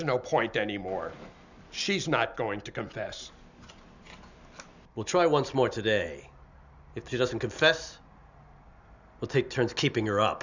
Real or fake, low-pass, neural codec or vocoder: real; 7.2 kHz; none